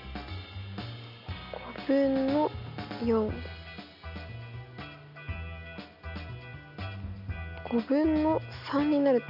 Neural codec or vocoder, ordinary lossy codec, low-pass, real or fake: none; none; 5.4 kHz; real